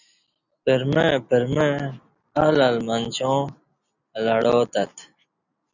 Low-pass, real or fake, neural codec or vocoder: 7.2 kHz; real; none